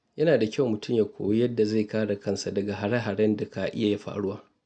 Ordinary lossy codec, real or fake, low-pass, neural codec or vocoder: none; real; 9.9 kHz; none